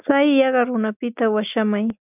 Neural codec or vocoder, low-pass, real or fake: none; 3.6 kHz; real